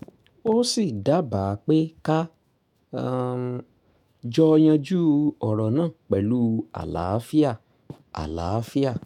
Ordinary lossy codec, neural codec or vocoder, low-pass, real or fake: MP3, 96 kbps; autoencoder, 48 kHz, 128 numbers a frame, DAC-VAE, trained on Japanese speech; 19.8 kHz; fake